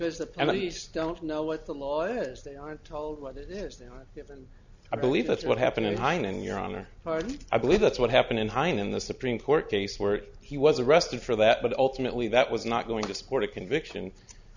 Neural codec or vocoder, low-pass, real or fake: none; 7.2 kHz; real